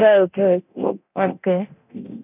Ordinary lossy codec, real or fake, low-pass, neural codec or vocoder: none; fake; 3.6 kHz; codec, 24 kHz, 0.9 kbps, DualCodec